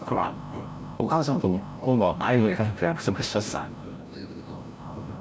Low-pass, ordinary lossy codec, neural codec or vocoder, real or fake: none; none; codec, 16 kHz, 0.5 kbps, FreqCodec, larger model; fake